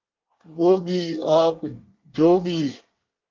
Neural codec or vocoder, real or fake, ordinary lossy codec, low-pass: codec, 24 kHz, 1 kbps, SNAC; fake; Opus, 24 kbps; 7.2 kHz